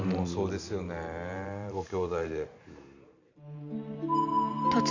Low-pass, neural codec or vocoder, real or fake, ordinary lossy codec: 7.2 kHz; vocoder, 44.1 kHz, 128 mel bands every 512 samples, BigVGAN v2; fake; none